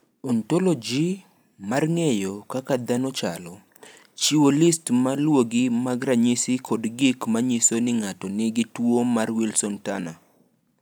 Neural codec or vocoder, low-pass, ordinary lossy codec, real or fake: none; none; none; real